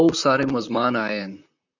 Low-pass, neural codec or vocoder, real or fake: 7.2 kHz; vocoder, 44.1 kHz, 128 mel bands, Pupu-Vocoder; fake